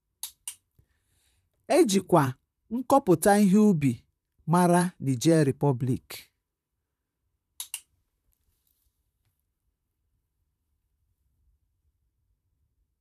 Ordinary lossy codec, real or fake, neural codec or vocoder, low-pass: none; fake; vocoder, 44.1 kHz, 128 mel bands, Pupu-Vocoder; 14.4 kHz